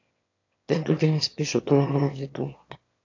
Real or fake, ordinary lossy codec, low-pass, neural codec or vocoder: fake; AAC, 48 kbps; 7.2 kHz; autoencoder, 22.05 kHz, a latent of 192 numbers a frame, VITS, trained on one speaker